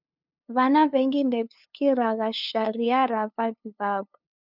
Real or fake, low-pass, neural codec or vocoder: fake; 5.4 kHz; codec, 16 kHz, 2 kbps, FunCodec, trained on LibriTTS, 25 frames a second